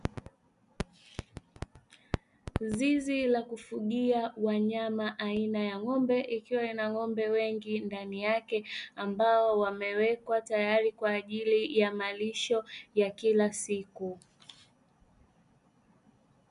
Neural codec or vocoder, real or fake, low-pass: none; real; 10.8 kHz